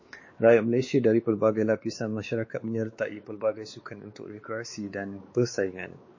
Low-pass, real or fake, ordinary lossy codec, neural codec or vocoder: 7.2 kHz; fake; MP3, 32 kbps; codec, 16 kHz, 4 kbps, X-Codec, WavLM features, trained on Multilingual LibriSpeech